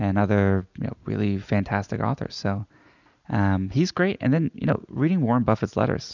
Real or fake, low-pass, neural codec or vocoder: real; 7.2 kHz; none